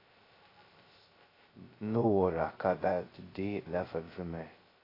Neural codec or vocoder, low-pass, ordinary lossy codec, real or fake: codec, 16 kHz, 0.2 kbps, FocalCodec; 5.4 kHz; AAC, 24 kbps; fake